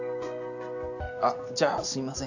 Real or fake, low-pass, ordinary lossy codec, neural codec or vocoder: real; 7.2 kHz; none; none